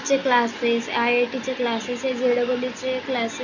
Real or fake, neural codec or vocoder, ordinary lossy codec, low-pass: real; none; AAC, 48 kbps; 7.2 kHz